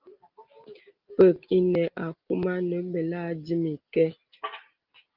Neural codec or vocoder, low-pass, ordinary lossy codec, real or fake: none; 5.4 kHz; Opus, 32 kbps; real